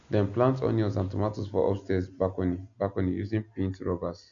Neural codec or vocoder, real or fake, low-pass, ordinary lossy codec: none; real; 7.2 kHz; none